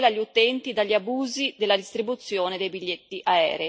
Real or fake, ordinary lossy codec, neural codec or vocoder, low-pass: real; none; none; none